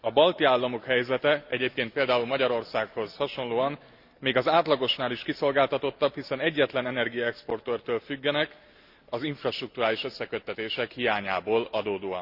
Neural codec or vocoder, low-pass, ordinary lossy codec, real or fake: none; 5.4 kHz; Opus, 64 kbps; real